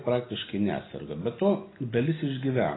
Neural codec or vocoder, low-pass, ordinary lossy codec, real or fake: none; 7.2 kHz; AAC, 16 kbps; real